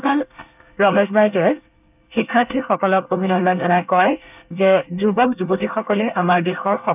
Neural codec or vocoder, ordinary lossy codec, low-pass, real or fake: codec, 24 kHz, 1 kbps, SNAC; none; 3.6 kHz; fake